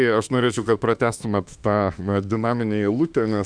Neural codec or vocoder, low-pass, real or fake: autoencoder, 48 kHz, 32 numbers a frame, DAC-VAE, trained on Japanese speech; 9.9 kHz; fake